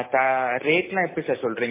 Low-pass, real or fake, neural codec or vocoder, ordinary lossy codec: 3.6 kHz; real; none; MP3, 16 kbps